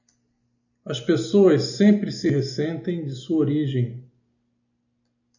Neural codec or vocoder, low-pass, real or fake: none; 7.2 kHz; real